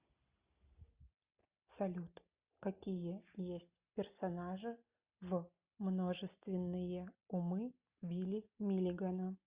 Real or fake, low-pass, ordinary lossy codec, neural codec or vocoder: real; 3.6 kHz; Opus, 64 kbps; none